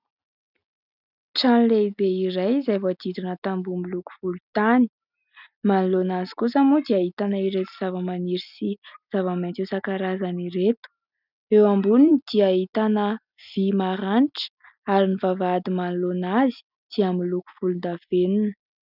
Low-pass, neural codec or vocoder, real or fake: 5.4 kHz; none; real